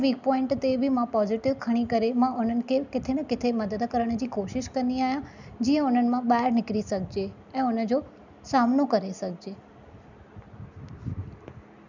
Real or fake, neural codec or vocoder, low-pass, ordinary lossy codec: real; none; 7.2 kHz; none